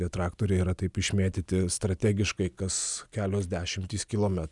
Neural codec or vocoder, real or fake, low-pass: none; real; 10.8 kHz